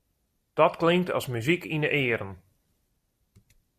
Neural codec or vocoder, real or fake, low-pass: none; real; 14.4 kHz